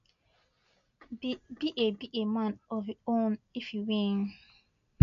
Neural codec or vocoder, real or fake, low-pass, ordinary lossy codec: none; real; 7.2 kHz; none